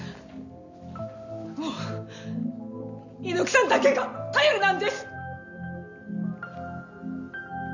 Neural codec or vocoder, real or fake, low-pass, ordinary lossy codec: none; real; 7.2 kHz; none